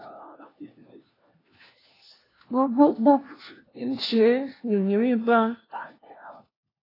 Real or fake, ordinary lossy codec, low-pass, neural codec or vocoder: fake; AAC, 24 kbps; 5.4 kHz; codec, 16 kHz, 1 kbps, FunCodec, trained on LibriTTS, 50 frames a second